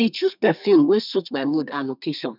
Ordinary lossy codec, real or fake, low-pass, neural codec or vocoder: none; fake; 5.4 kHz; codec, 32 kHz, 1.9 kbps, SNAC